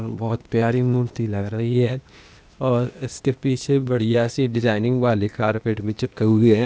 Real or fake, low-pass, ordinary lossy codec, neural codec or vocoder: fake; none; none; codec, 16 kHz, 0.8 kbps, ZipCodec